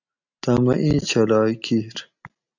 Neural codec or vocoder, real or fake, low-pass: none; real; 7.2 kHz